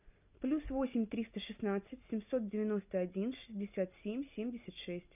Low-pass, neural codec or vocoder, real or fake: 3.6 kHz; none; real